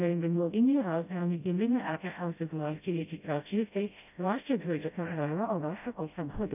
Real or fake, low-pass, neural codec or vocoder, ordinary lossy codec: fake; 3.6 kHz; codec, 16 kHz, 0.5 kbps, FreqCodec, smaller model; none